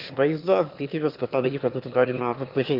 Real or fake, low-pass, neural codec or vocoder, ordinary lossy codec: fake; 5.4 kHz; autoencoder, 22.05 kHz, a latent of 192 numbers a frame, VITS, trained on one speaker; Opus, 32 kbps